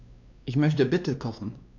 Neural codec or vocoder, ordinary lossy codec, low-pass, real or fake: codec, 16 kHz, 2 kbps, X-Codec, WavLM features, trained on Multilingual LibriSpeech; none; 7.2 kHz; fake